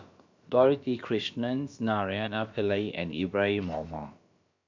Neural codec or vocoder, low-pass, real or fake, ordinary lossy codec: codec, 16 kHz, about 1 kbps, DyCAST, with the encoder's durations; 7.2 kHz; fake; MP3, 64 kbps